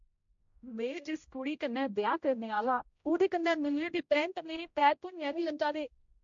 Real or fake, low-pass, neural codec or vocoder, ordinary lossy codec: fake; 7.2 kHz; codec, 16 kHz, 0.5 kbps, X-Codec, HuBERT features, trained on general audio; MP3, 48 kbps